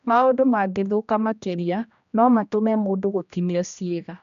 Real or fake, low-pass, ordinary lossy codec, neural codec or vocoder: fake; 7.2 kHz; none; codec, 16 kHz, 1 kbps, X-Codec, HuBERT features, trained on general audio